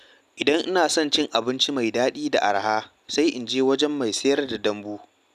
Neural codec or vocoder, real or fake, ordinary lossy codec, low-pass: none; real; none; 14.4 kHz